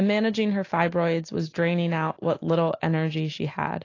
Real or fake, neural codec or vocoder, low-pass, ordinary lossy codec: real; none; 7.2 kHz; AAC, 32 kbps